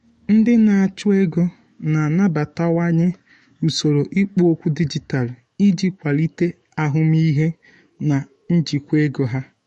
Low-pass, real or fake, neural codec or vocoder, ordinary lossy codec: 19.8 kHz; fake; autoencoder, 48 kHz, 128 numbers a frame, DAC-VAE, trained on Japanese speech; MP3, 48 kbps